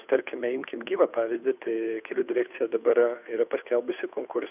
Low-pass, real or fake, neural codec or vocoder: 3.6 kHz; fake; codec, 16 kHz, 2 kbps, FunCodec, trained on Chinese and English, 25 frames a second